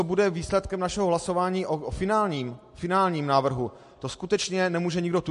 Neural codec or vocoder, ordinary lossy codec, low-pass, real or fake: none; MP3, 48 kbps; 14.4 kHz; real